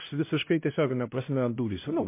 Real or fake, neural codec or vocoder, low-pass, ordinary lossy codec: fake; codec, 16 kHz, 1 kbps, X-Codec, HuBERT features, trained on LibriSpeech; 3.6 kHz; MP3, 24 kbps